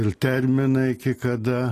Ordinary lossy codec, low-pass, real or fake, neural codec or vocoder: AAC, 48 kbps; 14.4 kHz; real; none